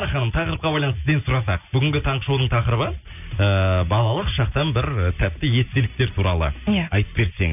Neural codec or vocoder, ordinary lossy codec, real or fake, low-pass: none; none; real; 3.6 kHz